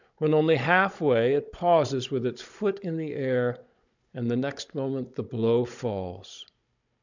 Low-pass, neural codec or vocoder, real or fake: 7.2 kHz; codec, 16 kHz, 16 kbps, FunCodec, trained on Chinese and English, 50 frames a second; fake